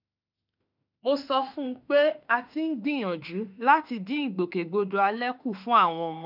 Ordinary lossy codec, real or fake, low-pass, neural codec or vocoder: none; fake; 5.4 kHz; autoencoder, 48 kHz, 32 numbers a frame, DAC-VAE, trained on Japanese speech